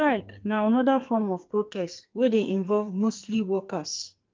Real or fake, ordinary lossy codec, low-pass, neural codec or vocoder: fake; Opus, 32 kbps; 7.2 kHz; codec, 44.1 kHz, 2.6 kbps, SNAC